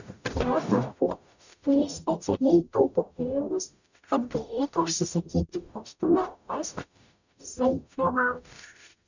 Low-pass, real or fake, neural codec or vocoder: 7.2 kHz; fake; codec, 44.1 kHz, 0.9 kbps, DAC